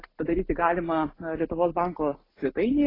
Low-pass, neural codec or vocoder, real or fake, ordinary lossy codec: 5.4 kHz; none; real; AAC, 24 kbps